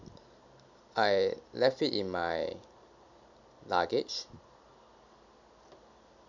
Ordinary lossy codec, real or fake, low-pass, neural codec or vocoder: none; real; 7.2 kHz; none